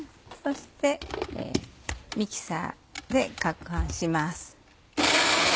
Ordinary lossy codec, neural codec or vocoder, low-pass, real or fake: none; none; none; real